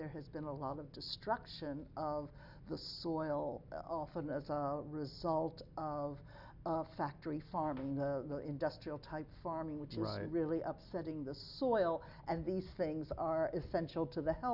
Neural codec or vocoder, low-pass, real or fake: none; 5.4 kHz; real